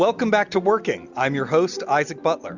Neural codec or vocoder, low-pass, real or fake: none; 7.2 kHz; real